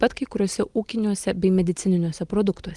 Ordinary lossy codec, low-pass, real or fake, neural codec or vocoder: Opus, 24 kbps; 10.8 kHz; real; none